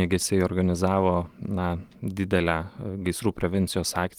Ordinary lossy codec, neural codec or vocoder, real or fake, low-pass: Opus, 24 kbps; none; real; 19.8 kHz